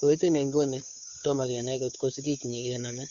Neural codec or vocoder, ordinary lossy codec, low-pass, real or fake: codec, 16 kHz, 2 kbps, FunCodec, trained on Chinese and English, 25 frames a second; none; 7.2 kHz; fake